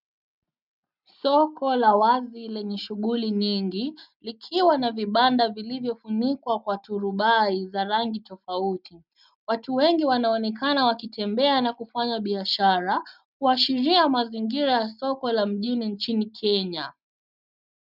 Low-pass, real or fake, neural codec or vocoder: 5.4 kHz; real; none